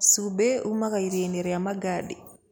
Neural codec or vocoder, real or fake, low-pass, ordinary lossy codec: none; real; none; none